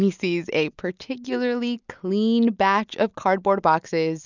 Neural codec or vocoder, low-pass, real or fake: none; 7.2 kHz; real